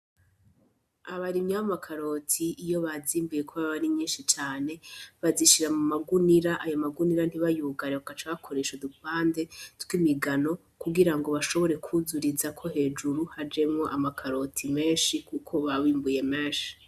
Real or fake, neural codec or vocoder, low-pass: real; none; 14.4 kHz